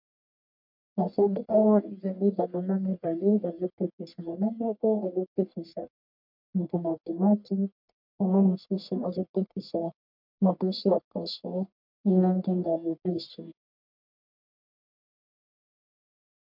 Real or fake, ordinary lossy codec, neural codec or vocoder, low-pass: fake; MP3, 48 kbps; codec, 44.1 kHz, 1.7 kbps, Pupu-Codec; 5.4 kHz